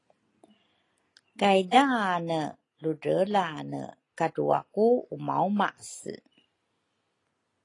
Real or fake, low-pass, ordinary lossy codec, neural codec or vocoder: real; 10.8 kHz; AAC, 32 kbps; none